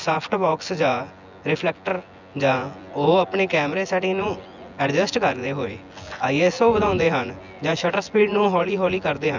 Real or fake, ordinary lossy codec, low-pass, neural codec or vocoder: fake; none; 7.2 kHz; vocoder, 24 kHz, 100 mel bands, Vocos